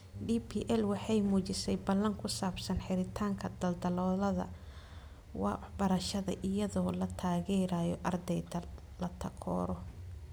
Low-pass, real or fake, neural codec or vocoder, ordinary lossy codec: none; real; none; none